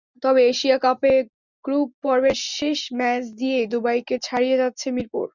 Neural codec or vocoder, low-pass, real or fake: none; 7.2 kHz; real